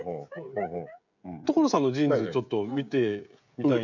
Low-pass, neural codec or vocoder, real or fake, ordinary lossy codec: 7.2 kHz; codec, 16 kHz, 16 kbps, FreqCodec, smaller model; fake; none